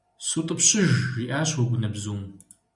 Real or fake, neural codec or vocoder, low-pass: real; none; 10.8 kHz